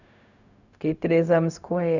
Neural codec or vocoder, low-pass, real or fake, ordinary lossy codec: codec, 16 kHz, 0.4 kbps, LongCat-Audio-Codec; 7.2 kHz; fake; none